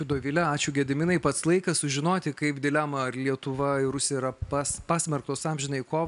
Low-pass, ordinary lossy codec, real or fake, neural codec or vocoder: 10.8 kHz; AAC, 96 kbps; real; none